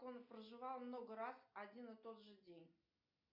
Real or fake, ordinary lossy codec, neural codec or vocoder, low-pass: real; MP3, 48 kbps; none; 5.4 kHz